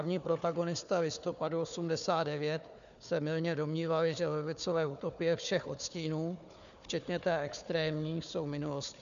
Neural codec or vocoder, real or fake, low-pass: codec, 16 kHz, 4 kbps, FunCodec, trained on Chinese and English, 50 frames a second; fake; 7.2 kHz